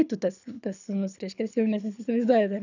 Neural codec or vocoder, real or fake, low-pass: codec, 24 kHz, 6 kbps, HILCodec; fake; 7.2 kHz